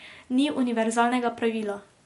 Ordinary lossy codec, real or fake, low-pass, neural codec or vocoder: MP3, 48 kbps; real; 14.4 kHz; none